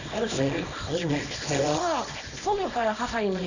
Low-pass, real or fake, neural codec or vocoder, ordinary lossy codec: 7.2 kHz; fake; codec, 24 kHz, 0.9 kbps, WavTokenizer, small release; none